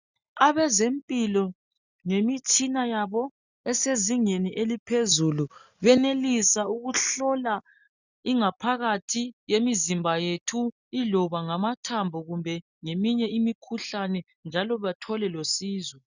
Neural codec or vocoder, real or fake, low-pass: none; real; 7.2 kHz